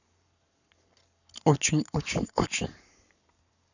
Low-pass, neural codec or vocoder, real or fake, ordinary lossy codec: 7.2 kHz; codec, 44.1 kHz, 7.8 kbps, Pupu-Codec; fake; none